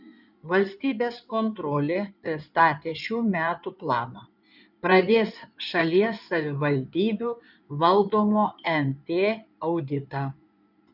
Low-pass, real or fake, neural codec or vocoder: 5.4 kHz; fake; codec, 16 kHz in and 24 kHz out, 2.2 kbps, FireRedTTS-2 codec